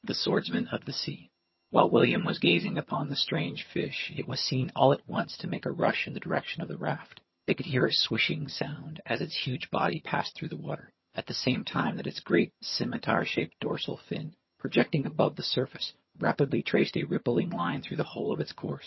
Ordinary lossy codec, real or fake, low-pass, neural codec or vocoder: MP3, 24 kbps; fake; 7.2 kHz; vocoder, 22.05 kHz, 80 mel bands, HiFi-GAN